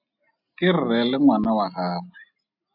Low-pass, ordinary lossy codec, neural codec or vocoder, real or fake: 5.4 kHz; AAC, 48 kbps; none; real